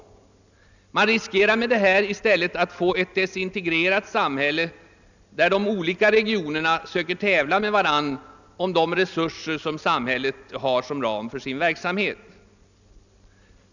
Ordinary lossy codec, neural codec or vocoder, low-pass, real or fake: none; none; 7.2 kHz; real